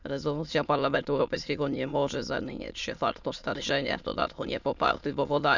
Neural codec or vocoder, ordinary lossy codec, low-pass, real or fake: autoencoder, 22.05 kHz, a latent of 192 numbers a frame, VITS, trained on many speakers; none; 7.2 kHz; fake